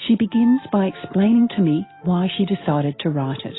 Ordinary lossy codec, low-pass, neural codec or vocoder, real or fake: AAC, 16 kbps; 7.2 kHz; none; real